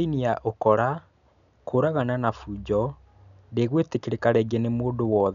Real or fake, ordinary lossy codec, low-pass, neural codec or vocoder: real; none; 7.2 kHz; none